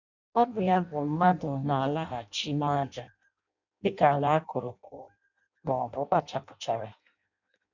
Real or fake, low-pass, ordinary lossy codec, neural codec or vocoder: fake; 7.2 kHz; none; codec, 16 kHz in and 24 kHz out, 0.6 kbps, FireRedTTS-2 codec